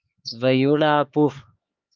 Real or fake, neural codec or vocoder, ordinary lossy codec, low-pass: fake; codec, 16 kHz, 4 kbps, X-Codec, HuBERT features, trained on LibriSpeech; Opus, 24 kbps; 7.2 kHz